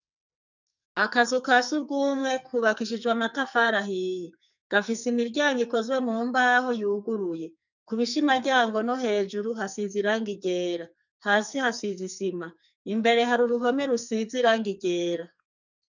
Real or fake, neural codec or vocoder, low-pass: fake; codec, 44.1 kHz, 2.6 kbps, SNAC; 7.2 kHz